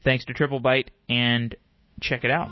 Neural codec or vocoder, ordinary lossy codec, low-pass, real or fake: autoencoder, 48 kHz, 128 numbers a frame, DAC-VAE, trained on Japanese speech; MP3, 24 kbps; 7.2 kHz; fake